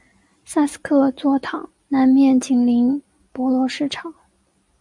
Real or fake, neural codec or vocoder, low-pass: real; none; 10.8 kHz